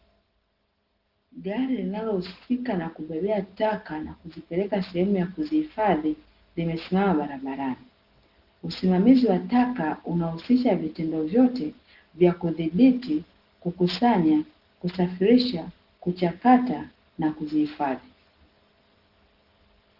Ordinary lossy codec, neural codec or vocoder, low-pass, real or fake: Opus, 16 kbps; none; 5.4 kHz; real